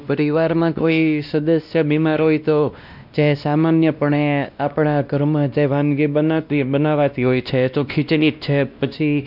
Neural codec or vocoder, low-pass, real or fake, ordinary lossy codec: codec, 16 kHz, 1 kbps, X-Codec, WavLM features, trained on Multilingual LibriSpeech; 5.4 kHz; fake; none